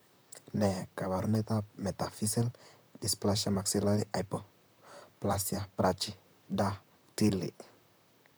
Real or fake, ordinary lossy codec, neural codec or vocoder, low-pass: fake; none; vocoder, 44.1 kHz, 128 mel bands, Pupu-Vocoder; none